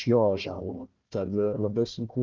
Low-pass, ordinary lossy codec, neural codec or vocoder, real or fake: 7.2 kHz; Opus, 32 kbps; codec, 16 kHz, 1 kbps, FunCodec, trained on Chinese and English, 50 frames a second; fake